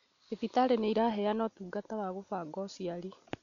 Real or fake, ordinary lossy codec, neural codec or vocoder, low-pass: real; none; none; 7.2 kHz